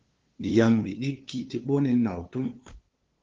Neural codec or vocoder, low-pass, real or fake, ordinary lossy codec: codec, 16 kHz, 2 kbps, FunCodec, trained on LibriTTS, 25 frames a second; 7.2 kHz; fake; Opus, 16 kbps